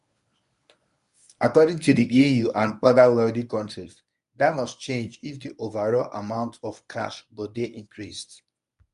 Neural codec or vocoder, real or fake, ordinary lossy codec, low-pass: codec, 24 kHz, 0.9 kbps, WavTokenizer, medium speech release version 1; fake; none; 10.8 kHz